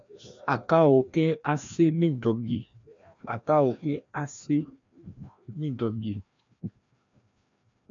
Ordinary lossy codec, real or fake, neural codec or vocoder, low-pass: MP3, 64 kbps; fake; codec, 16 kHz, 1 kbps, FreqCodec, larger model; 7.2 kHz